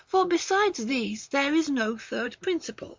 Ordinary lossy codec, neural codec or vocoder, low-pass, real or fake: MP3, 64 kbps; vocoder, 44.1 kHz, 128 mel bands, Pupu-Vocoder; 7.2 kHz; fake